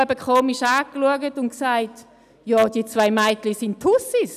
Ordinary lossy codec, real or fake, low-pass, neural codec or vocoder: none; real; 14.4 kHz; none